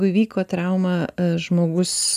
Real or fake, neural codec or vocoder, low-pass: real; none; 14.4 kHz